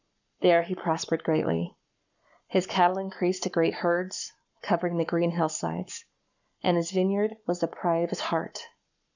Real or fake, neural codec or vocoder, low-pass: fake; codec, 44.1 kHz, 7.8 kbps, Pupu-Codec; 7.2 kHz